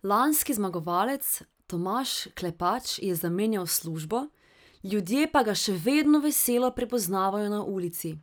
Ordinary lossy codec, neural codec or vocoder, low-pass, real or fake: none; none; none; real